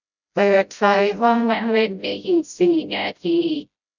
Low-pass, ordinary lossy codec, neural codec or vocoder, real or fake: 7.2 kHz; none; codec, 16 kHz, 0.5 kbps, FreqCodec, smaller model; fake